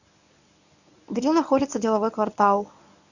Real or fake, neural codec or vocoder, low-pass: fake; codec, 24 kHz, 0.9 kbps, WavTokenizer, medium speech release version 1; 7.2 kHz